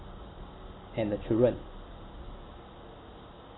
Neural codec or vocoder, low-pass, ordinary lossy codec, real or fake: none; 7.2 kHz; AAC, 16 kbps; real